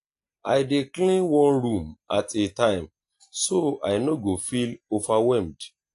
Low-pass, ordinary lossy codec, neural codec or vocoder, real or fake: 10.8 kHz; AAC, 48 kbps; none; real